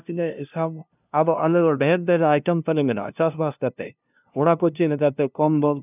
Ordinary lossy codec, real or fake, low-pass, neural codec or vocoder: none; fake; 3.6 kHz; codec, 16 kHz, 0.5 kbps, FunCodec, trained on LibriTTS, 25 frames a second